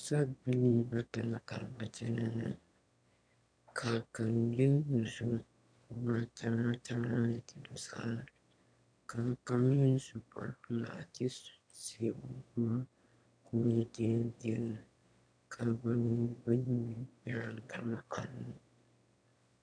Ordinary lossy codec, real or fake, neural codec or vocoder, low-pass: MP3, 64 kbps; fake; autoencoder, 22.05 kHz, a latent of 192 numbers a frame, VITS, trained on one speaker; 9.9 kHz